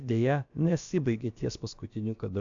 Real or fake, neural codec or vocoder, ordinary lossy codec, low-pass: fake; codec, 16 kHz, about 1 kbps, DyCAST, with the encoder's durations; AAC, 64 kbps; 7.2 kHz